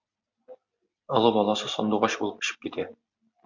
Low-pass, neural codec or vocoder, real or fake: 7.2 kHz; none; real